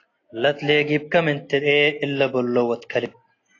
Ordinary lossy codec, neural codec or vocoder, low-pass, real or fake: AAC, 32 kbps; none; 7.2 kHz; real